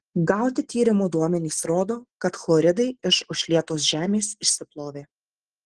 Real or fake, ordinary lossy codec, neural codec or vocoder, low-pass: real; Opus, 16 kbps; none; 10.8 kHz